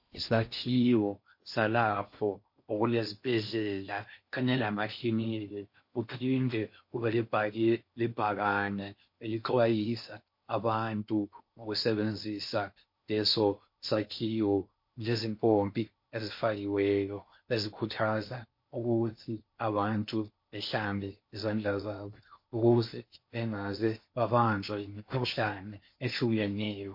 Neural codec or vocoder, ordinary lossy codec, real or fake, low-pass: codec, 16 kHz in and 24 kHz out, 0.6 kbps, FocalCodec, streaming, 4096 codes; MP3, 32 kbps; fake; 5.4 kHz